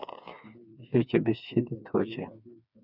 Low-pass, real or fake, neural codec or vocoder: 5.4 kHz; fake; codec, 16 kHz, 4 kbps, FreqCodec, smaller model